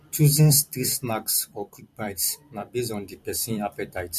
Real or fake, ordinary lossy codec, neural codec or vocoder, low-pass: fake; MP3, 64 kbps; vocoder, 44.1 kHz, 128 mel bands every 256 samples, BigVGAN v2; 14.4 kHz